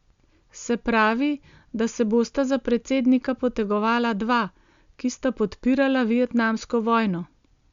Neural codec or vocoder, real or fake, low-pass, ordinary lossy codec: none; real; 7.2 kHz; Opus, 64 kbps